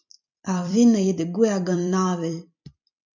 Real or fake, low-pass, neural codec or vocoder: fake; 7.2 kHz; vocoder, 44.1 kHz, 128 mel bands every 256 samples, BigVGAN v2